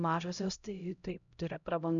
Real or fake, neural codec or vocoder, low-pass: fake; codec, 16 kHz, 0.5 kbps, X-Codec, HuBERT features, trained on LibriSpeech; 7.2 kHz